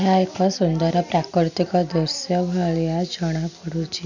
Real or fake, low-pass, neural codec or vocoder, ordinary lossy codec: real; 7.2 kHz; none; none